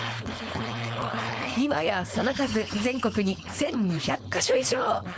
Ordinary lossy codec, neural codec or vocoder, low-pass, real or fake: none; codec, 16 kHz, 4.8 kbps, FACodec; none; fake